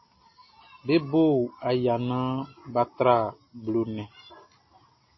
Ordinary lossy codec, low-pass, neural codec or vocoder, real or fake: MP3, 24 kbps; 7.2 kHz; none; real